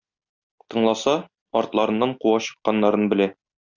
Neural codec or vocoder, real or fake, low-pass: none; real; 7.2 kHz